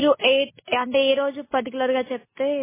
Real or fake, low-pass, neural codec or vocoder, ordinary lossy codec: real; 3.6 kHz; none; MP3, 16 kbps